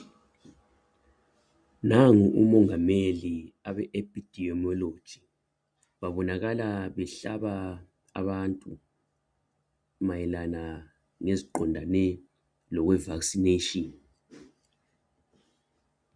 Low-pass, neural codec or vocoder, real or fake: 9.9 kHz; none; real